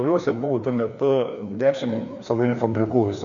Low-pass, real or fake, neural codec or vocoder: 7.2 kHz; fake; codec, 16 kHz, 2 kbps, FreqCodec, larger model